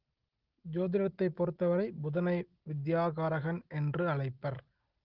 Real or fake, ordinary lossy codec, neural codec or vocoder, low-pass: real; Opus, 16 kbps; none; 5.4 kHz